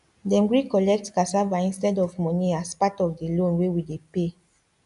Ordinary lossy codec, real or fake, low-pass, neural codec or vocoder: none; real; 10.8 kHz; none